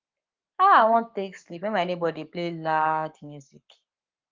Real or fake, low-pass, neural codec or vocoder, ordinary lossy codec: fake; 7.2 kHz; codec, 44.1 kHz, 7.8 kbps, Pupu-Codec; Opus, 32 kbps